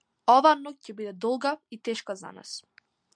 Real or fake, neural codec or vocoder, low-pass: real; none; 9.9 kHz